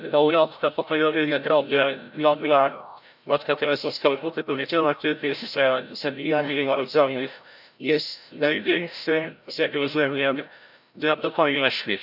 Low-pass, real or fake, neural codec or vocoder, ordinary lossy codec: 5.4 kHz; fake; codec, 16 kHz, 0.5 kbps, FreqCodec, larger model; none